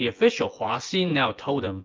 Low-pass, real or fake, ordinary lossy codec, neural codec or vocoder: 7.2 kHz; fake; Opus, 24 kbps; vocoder, 24 kHz, 100 mel bands, Vocos